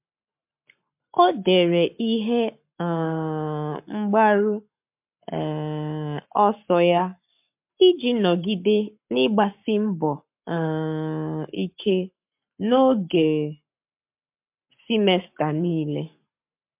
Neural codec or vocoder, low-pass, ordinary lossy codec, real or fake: codec, 44.1 kHz, 7.8 kbps, Pupu-Codec; 3.6 kHz; MP3, 32 kbps; fake